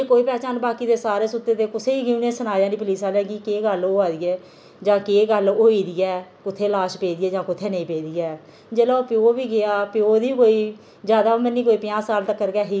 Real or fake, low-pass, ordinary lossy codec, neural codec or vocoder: real; none; none; none